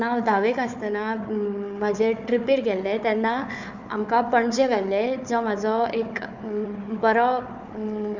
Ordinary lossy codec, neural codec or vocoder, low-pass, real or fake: none; codec, 16 kHz, 4 kbps, FunCodec, trained on Chinese and English, 50 frames a second; 7.2 kHz; fake